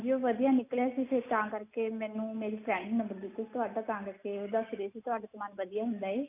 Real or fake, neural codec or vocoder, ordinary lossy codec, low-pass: real; none; none; 3.6 kHz